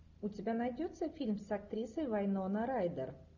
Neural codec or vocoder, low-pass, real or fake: none; 7.2 kHz; real